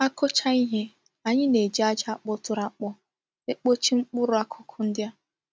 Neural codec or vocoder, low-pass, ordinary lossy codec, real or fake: none; none; none; real